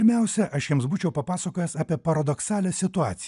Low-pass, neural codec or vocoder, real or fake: 10.8 kHz; none; real